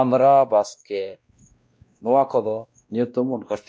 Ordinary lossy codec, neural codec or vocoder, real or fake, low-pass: none; codec, 16 kHz, 1 kbps, X-Codec, WavLM features, trained on Multilingual LibriSpeech; fake; none